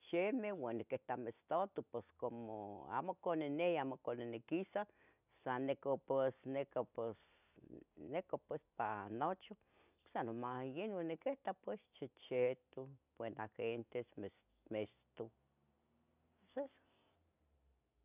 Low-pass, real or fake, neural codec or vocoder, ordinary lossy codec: 3.6 kHz; real; none; none